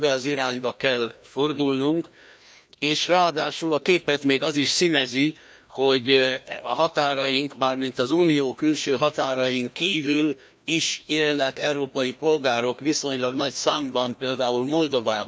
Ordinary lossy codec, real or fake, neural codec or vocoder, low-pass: none; fake; codec, 16 kHz, 1 kbps, FreqCodec, larger model; none